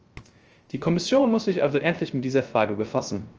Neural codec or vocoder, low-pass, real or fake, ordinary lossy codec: codec, 16 kHz, 0.3 kbps, FocalCodec; 7.2 kHz; fake; Opus, 24 kbps